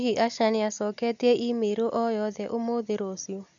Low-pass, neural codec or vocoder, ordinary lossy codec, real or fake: 7.2 kHz; none; none; real